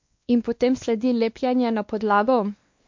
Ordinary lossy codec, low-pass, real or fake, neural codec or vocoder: MP3, 48 kbps; 7.2 kHz; fake; codec, 16 kHz, 2 kbps, X-Codec, WavLM features, trained on Multilingual LibriSpeech